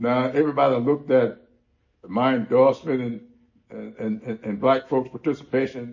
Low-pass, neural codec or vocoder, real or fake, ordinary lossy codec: 7.2 kHz; none; real; MP3, 32 kbps